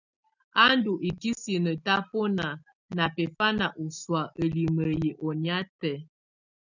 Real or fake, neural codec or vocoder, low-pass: real; none; 7.2 kHz